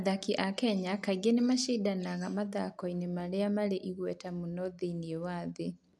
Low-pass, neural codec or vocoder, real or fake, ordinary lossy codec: none; none; real; none